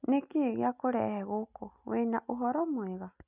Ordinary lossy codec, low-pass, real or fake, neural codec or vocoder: none; 3.6 kHz; real; none